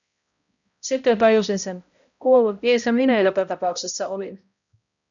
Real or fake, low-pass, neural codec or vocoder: fake; 7.2 kHz; codec, 16 kHz, 0.5 kbps, X-Codec, HuBERT features, trained on balanced general audio